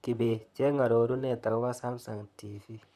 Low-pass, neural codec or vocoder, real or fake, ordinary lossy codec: 14.4 kHz; vocoder, 44.1 kHz, 128 mel bands, Pupu-Vocoder; fake; none